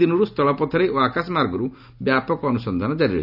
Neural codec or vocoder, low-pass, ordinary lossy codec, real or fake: none; 5.4 kHz; none; real